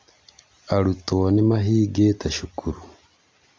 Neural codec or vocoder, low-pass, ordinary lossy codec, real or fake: none; none; none; real